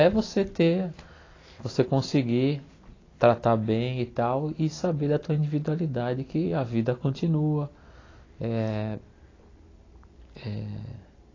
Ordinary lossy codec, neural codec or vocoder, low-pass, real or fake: AAC, 32 kbps; none; 7.2 kHz; real